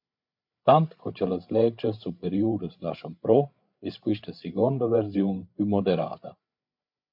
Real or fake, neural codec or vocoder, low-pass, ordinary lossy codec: fake; codec, 16 kHz, 8 kbps, FreqCodec, larger model; 5.4 kHz; MP3, 48 kbps